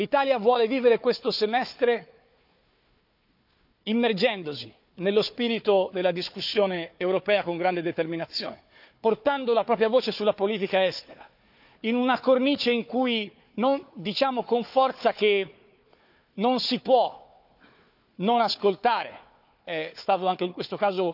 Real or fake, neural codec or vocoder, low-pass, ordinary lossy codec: fake; codec, 16 kHz, 4 kbps, FunCodec, trained on Chinese and English, 50 frames a second; 5.4 kHz; none